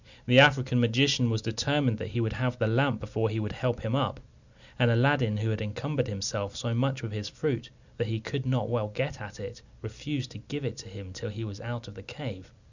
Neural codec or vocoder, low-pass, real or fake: none; 7.2 kHz; real